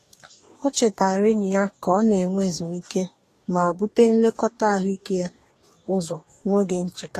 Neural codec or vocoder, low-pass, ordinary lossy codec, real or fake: codec, 44.1 kHz, 2.6 kbps, DAC; 14.4 kHz; AAC, 48 kbps; fake